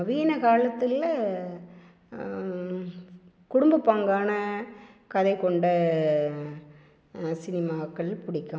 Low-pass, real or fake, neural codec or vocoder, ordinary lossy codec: none; real; none; none